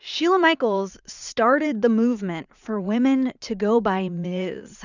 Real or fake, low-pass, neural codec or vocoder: fake; 7.2 kHz; vocoder, 44.1 kHz, 80 mel bands, Vocos